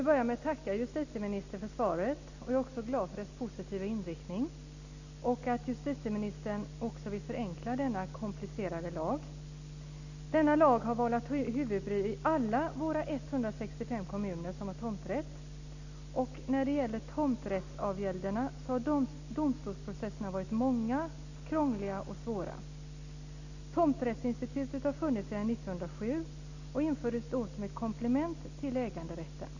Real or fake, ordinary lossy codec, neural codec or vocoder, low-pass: real; none; none; 7.2 kHz